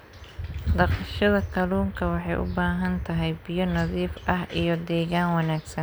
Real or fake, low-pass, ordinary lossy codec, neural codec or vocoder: real; none; none; none